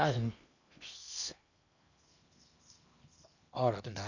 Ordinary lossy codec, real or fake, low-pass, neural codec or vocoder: none; fake; 7.2 kHz; codec, 16 kHz in and 24 kHz out, 0.8 kbps, FocalCodec, streaming, 65536 codes